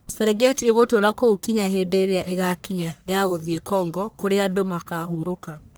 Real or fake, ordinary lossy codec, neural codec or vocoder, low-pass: fake; none; codec, 44.1 kHz, 1.7 kbps, Pupu-Codec; none